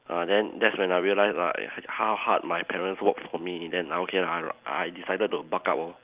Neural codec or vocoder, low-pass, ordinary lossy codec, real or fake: none; 3.6 kHz; Opus, 24 kbps; real